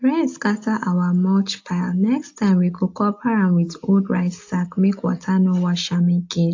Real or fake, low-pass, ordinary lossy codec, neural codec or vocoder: real; 7.2 kHz; AAC, 48 kbps; none